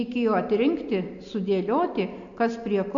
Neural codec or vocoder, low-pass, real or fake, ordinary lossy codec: none; 7.2 kHz; real; AAC, 64 kbps